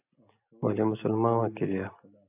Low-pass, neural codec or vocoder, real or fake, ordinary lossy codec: 3.6 kHz; none; real; AAC, 32 kbps